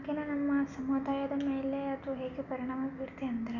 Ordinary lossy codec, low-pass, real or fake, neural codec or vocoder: none; 7.2 kHz; real; none